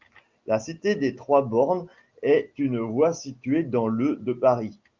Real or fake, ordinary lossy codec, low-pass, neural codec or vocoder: real; Opus, 32 kbps; 7.2 kHz; none